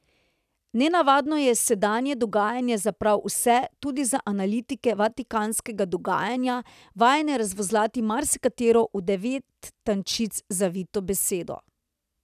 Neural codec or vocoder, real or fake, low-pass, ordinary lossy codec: none; real; 14.4 kHz; none